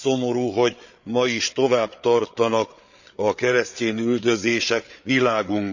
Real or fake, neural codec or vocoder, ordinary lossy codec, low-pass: fake; codec, 16 kHz, 8 kbps, FreqCodec, larger model; none; 7.2 kHz